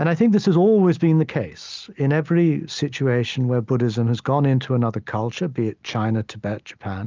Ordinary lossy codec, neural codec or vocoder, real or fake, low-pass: Opus, 24 kbps; none; real; 7.2 kHz